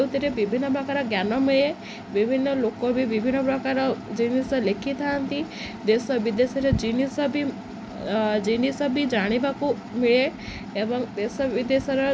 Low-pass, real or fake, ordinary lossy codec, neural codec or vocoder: none; real; none; none